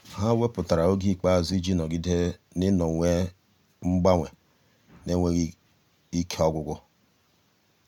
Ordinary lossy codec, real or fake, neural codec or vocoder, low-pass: none; real; none; 19.8 kHz